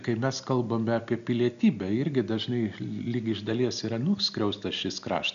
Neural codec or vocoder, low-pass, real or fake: none; 7.2 kHz; real